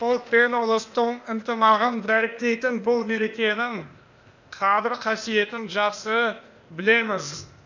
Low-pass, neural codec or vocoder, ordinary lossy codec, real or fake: 7.2 kHz; codec, 16 kHz, 0.8 kbps, ZipCodec; none; fake